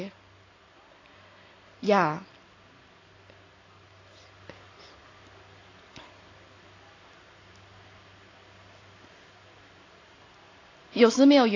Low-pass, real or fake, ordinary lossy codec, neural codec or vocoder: 7.2 kHz; real; AAC, 32 kbps; none